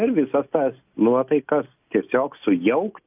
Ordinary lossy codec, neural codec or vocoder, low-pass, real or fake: AAC, 32 kbps; none; 3.6 kHz; real